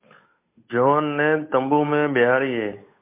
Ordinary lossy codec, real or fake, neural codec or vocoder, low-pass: MP3, 24 kbps; fake; codec, 16 kHz, 8 kbps, FunCodec, trained on Chinese and English, 25 frames a second; 3.6 kHz